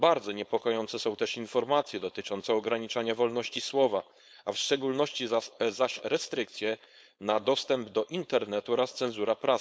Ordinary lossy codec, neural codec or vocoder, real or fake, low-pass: none; codec, 16 kHz, 4.8 kbps, FACodec; fake; none